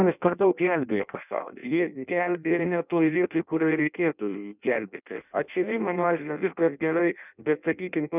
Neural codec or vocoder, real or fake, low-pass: codec, 16 kHz in and 24 kHz out, 0.6 kbps, FireRedTTS-2 codec; fake; 3.6 kHz